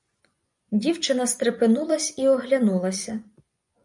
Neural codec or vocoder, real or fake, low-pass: vocoder, 24 kHz, 100 mel bands, Vocos; fake; 10.8 kHz